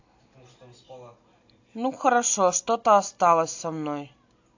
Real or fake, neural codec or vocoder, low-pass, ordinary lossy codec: real; none; 7.2 kHz; AAC, 48 kbps